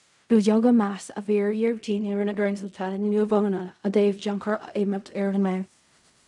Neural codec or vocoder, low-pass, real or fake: codec, 16 kHz in and 24 kHz out, 0.4 kbps, LongCat-Audio-Codec, fine tuned four codebook decoder; 10.8 kHz; fake